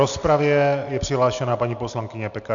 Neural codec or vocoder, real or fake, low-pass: none; real; 7.2 kHz